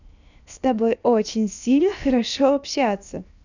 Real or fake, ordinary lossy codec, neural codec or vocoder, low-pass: fake; none; codec, 16 kHz, 0.7 kbps, FocalCodec; 7.2 kHz